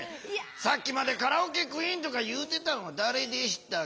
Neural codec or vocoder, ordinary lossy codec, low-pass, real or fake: none; none; none; real